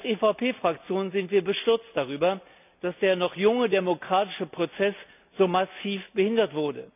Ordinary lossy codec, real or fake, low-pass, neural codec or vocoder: none; real; 3.6 kHz; none